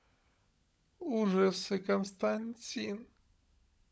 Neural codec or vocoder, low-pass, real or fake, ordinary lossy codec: codec, 16 kHz, 16 kbps, FunCodec, trained on LibriTTS, 50 frames a second; none; fake; none